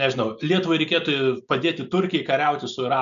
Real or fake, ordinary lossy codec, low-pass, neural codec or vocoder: real; AAC, 96 kbps; 7.2 kHz; none